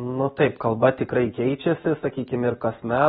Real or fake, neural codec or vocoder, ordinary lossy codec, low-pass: real; none; AAC, 16 kbps; 19.8 kHz